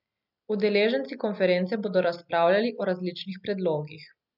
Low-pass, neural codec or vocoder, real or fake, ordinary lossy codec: 5.4 kHz; none; real; none